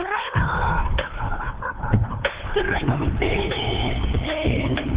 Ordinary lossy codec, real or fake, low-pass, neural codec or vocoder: Opus, 16 kbps; fake; 3.6 kHz; codec, 16 kHz, 2 kbps, FunCodec, trained on LibriTTS, 25 frames a second